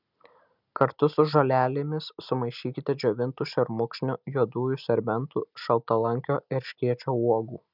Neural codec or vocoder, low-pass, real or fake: none; 5.4 kHz; real